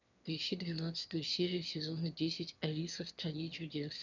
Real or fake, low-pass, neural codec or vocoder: fake; 7.2 kHz; autoencoder, 22.05 kHz, a latent of 192 numbers a frame, VITS, trained on one speaker